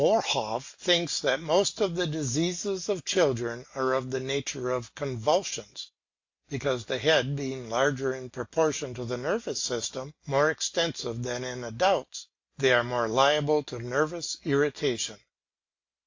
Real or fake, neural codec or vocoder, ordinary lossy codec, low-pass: real; none; AAC, 48 kbps; 7.2 kHz